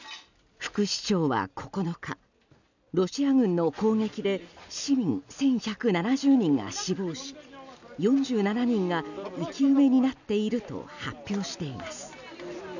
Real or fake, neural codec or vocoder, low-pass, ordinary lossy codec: real; none; 7.2 kHz; none